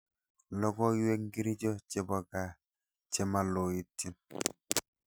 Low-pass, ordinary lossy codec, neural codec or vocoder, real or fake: none; none; none; real